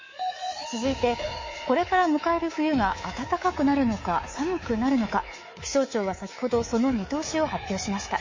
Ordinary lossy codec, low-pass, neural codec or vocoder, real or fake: MP3, 32 kbps; 7.2 kHz; codec, 24 kHz, 3.1 kbps, DualCodec; fake